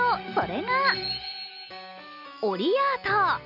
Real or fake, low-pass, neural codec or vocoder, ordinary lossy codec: real; 5.4 kHz; none; none